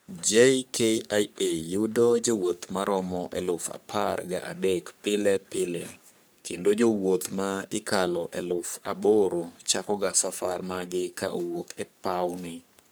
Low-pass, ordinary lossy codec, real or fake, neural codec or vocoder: none; none; fake; codec, 44.1 kHz, 3.4 kbps, Pupu-Codec